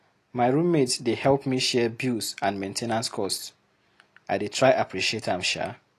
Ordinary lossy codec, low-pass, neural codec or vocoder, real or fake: AAC, 48 kbps; 14.4 kHz; none; real